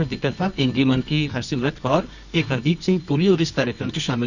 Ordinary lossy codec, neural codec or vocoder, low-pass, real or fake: none; codec, 24 kHz, 0.9 kbps, WavTokenizer, medium music audio release; 7.2 kHz; fake